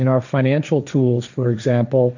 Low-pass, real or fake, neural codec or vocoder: 7.2 kHz; fake; codec, 16 kHz, 1.1 kbps, Voila-Tokenizer